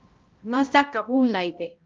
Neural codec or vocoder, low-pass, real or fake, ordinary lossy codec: codec, 16 kHz, 0.5 kbps, X-Codec, HuBERT features, trained on balanced general audio; 7.2 kHz; fake; Opus, 24 kbps